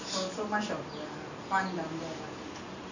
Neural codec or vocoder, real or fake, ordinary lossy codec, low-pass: none; real; none; 7.2 kHz